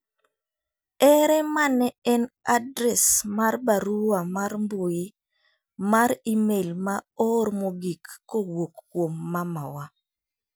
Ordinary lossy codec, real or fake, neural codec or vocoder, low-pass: none; real; none; none